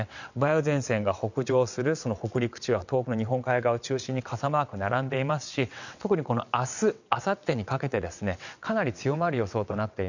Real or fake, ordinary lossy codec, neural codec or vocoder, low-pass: fake; none; vocoder, 22.05 kHz, 80 mel bands, WaveNeXt; 7.2 kHz